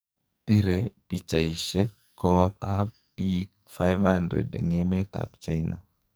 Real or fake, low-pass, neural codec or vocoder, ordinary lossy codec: fake; none; codec, 44.1 kHz, 2.6 kbps, SNAC; none